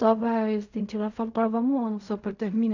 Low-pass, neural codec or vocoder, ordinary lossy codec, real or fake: 7.2 kHz; codec, 16 kHz in and 24 kHz out, 0.4 kbps, LongCat-Audio-Codec, fine tuned four codebook decoder; none; fake